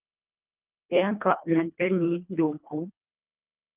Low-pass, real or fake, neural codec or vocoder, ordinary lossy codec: 3.6 kHz; fake; codec, 24 kHz, 1.5 kbps, HILCodec; Opus, 24 kbps